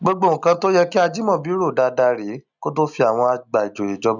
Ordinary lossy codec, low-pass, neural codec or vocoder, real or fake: none; 7.2 kHz; none; real